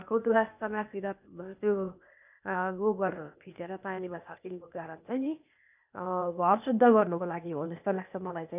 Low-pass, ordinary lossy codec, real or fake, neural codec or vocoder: 3.6 kHz; none; fake; codec, 16 kHz, 0.8 kbps, ZipCodec